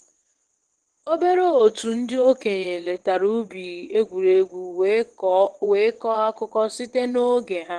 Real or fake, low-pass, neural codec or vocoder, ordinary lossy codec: fake; 9.9 kHz; vocoder, 22.05 kHz, 80 mel bands, WaveNeXt; Opus, 16 kbps